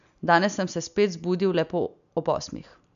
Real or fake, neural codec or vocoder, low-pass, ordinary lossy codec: real; none; 7.2 kHz; none